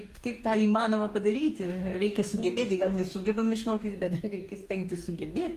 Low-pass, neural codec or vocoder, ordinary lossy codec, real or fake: 14.4 kHz; codec, 44.1 kHz, 2.6 kbps, DAC; Opus, 32 kbps; fake